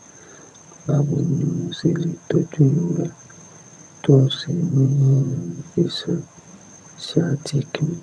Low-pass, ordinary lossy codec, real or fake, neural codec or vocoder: none; none; fake; vocoder, 22.05 kHz, 80 mel bands, HiFi-GAN